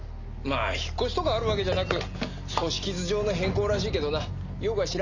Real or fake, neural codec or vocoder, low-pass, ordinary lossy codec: real; none; 7.2 kHz; none